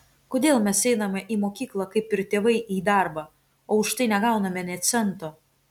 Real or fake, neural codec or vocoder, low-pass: real; none; 19.8 kHz